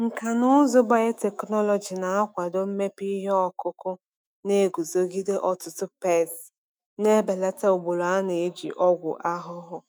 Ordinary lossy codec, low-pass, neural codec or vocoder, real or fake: none; none; autoencoder, 48 kHz, 128 numbers a frame, DAC-VAE, trained on Japanese speech; fake